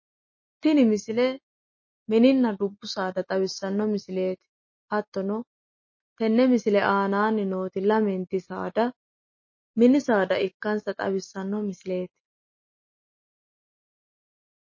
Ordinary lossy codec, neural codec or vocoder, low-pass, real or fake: MP3, 32 kbps; none; 7.2 kHz; real